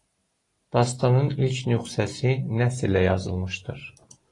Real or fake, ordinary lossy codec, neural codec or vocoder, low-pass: real; AAC, 32 kbps; none; 10.8 kHz